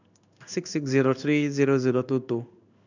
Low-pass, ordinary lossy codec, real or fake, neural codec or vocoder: 7.2 kHz; none; fake; codec, 16 kHz in and 24 kHz out, 1 kbps, XY-Tokenizer